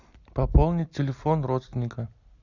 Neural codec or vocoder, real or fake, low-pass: none; real; 7.2 kHz